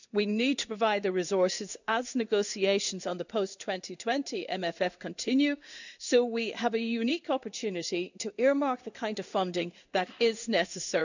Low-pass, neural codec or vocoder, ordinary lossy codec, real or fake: 7.2 kHz; codec, 16 kHz in and 24 kHz out, 1 kbps, XY-Tokenizer; none; fake